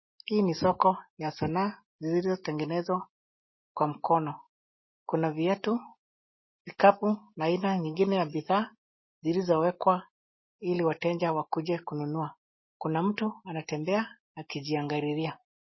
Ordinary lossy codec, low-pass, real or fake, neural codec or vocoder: MP3, 24 kbps; 7.2 kHz; real; none